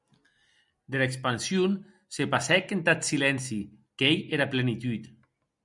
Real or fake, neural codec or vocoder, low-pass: real; none; 10.8 kHz